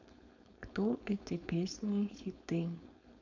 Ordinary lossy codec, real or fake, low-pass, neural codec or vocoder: none; fake; 7.2 kHz; codec, 16 kHz, 4.8 kbps, FACodec